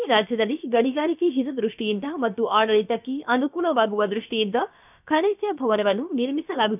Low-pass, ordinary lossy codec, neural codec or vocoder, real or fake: 3.6 kHz; none; codec, 16 kHz, about 1 kbps, DyCAST, with the encoder's durations; fake